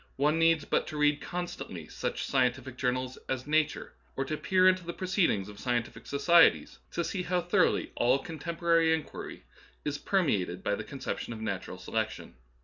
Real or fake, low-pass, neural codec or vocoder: real; 7.2 kHz; none